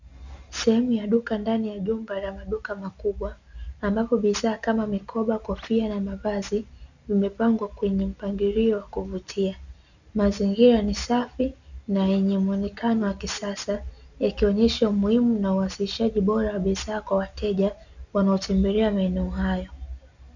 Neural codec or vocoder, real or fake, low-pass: none; real; 7.2 kHz